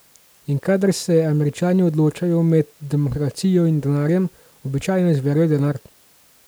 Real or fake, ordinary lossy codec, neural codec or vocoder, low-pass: real; none; none; none